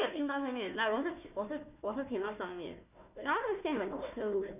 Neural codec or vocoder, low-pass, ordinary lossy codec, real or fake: codec, 16 kHz, 1 kbps, FunCodec, trained on Chinese and English, 50 frames a second; 3.6 kHz; none; fake